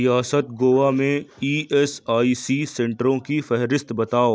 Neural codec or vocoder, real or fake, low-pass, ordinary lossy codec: none; real; none; none